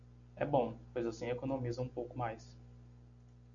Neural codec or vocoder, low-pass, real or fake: none; 7.2 kHz; real